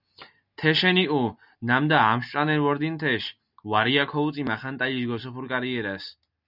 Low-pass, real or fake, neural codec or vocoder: 5.4 kHz; real; none